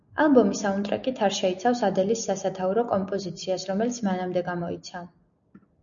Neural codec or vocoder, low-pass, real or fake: none; 7.2 kHz; real